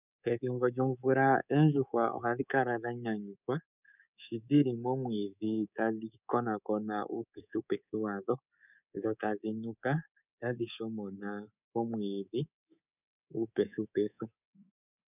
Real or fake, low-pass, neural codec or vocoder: fake; 3.6 kHz; codec, 24 kHz, 3.1 kbps, DualCodec